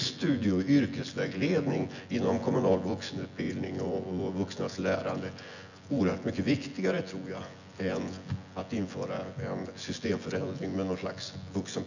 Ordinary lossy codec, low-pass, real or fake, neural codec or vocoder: none; 7.2 kHz; fake; vocoder, 24 kHz, 100 mel bands, Vocos